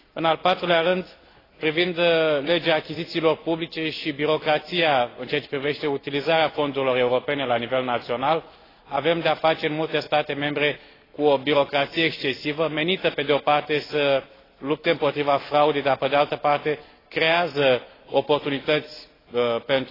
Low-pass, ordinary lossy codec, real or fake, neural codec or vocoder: 5.4 kHz; AAC, 24 kbps; real; none